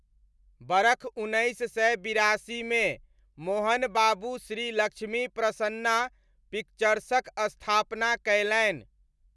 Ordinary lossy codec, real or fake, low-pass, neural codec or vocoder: none; real; none; none